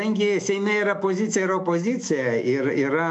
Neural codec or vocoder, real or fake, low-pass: autoencoder, 48 kHz, 128 numbers a frame, DAC-VAE, trained on Japanese speech; fake; 10.8 kHz